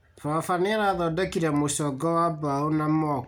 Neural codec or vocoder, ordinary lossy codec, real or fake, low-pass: none; none; real; 19.8 kHz